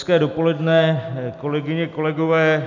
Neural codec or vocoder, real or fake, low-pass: none; real; 7.2 kHz